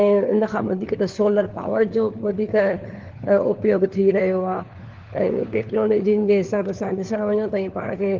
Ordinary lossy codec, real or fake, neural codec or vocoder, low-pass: Opus, 16 kbps; fake; codec, 16 kHz, 4 kbps, FunCodec, trained on LibriTTS, 50 frames a second; 7.2 kHz